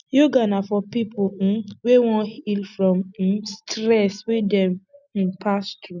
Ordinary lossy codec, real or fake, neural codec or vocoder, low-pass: none; real; none; 7.2 kHz